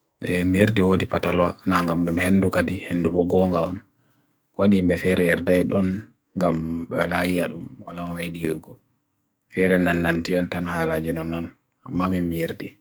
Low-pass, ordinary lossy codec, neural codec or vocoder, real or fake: none; none; codec, 44.1 kHz, 2.6 kbps, SNAC; fake